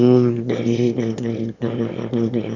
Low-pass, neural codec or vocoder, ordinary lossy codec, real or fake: 7.2 kHz; autoencoder, 22.05 kHz, a latent of 192 numbers a frame, VITS, trained on one speaker; none; fake